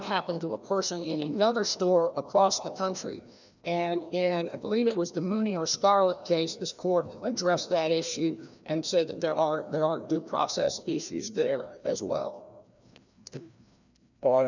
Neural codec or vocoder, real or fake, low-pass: codec, 16 kHz, 1 kbps, FreqCodec, larger model; fake; 7.2 kHz